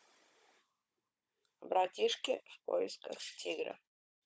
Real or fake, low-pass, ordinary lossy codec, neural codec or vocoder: fake; none; none; codec, 16 kHz, 16 kbps, FunCodec, trained on Chinese and English, 50 frames a second